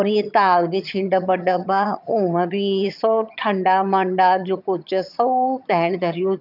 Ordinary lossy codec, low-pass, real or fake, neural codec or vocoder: none; 5.4 kHz; fake; vocoder, 22.05 kHz, 80 mel bands, HiFi-GAN